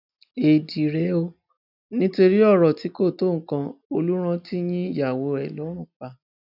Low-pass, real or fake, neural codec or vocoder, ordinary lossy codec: 5.4 kHz; real; none; none